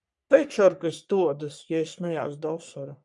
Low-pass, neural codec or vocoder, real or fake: 10.8 kHz; codec, 44.1 kHz, 3.4 kbps, Pupu-Codec; fake